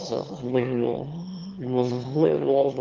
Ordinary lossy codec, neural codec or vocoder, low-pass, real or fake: Opus, 16 kbps; autoencoder, 22.05 kHz, a latent of 192 numbers a frame, VITS, trained on one speaker; 7.2 kHz; fake